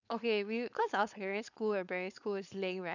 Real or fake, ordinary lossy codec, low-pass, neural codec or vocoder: fake; none; 7.2 kHz; codec, 16 kHz, 4.8 kbps, FACodec